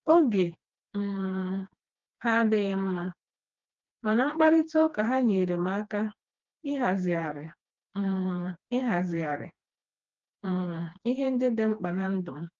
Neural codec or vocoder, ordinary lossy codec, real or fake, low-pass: codec, 16 kHz, 2 kbps, FreqCodec, smaller model; Opus, 24 kbps; fake; 7.2 kHz